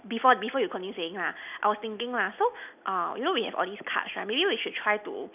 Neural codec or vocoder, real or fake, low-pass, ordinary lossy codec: none; real; 3.6 kHz; none